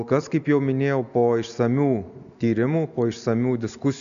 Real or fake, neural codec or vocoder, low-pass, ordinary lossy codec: real; none; 7.2 kHz; MP3, 96 kbps